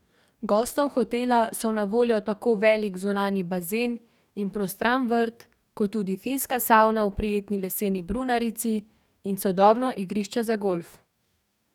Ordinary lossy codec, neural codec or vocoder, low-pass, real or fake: none; codec, 44.1 kHz, 2.6 kbps, DAC; 19.8 kHz; fake